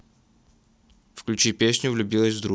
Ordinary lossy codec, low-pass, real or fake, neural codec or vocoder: none; none; real; none